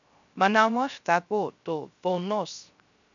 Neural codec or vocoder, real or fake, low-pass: codec, 16 kHz, 0.3 kbps, FocalCodec; fake; 7.2 kHz